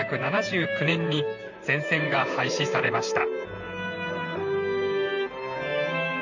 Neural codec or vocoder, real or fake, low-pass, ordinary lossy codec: vocoder, 44.1 kHz, 128 mel bands, Pupu-Vocoder; fake; 7.2 kHz; none